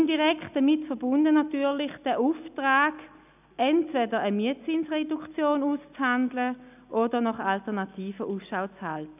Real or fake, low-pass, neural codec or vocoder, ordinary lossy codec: real; 3.6 kHz; none; none